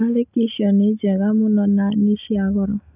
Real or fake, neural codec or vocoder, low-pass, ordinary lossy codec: real; none; 3.6 kHz; none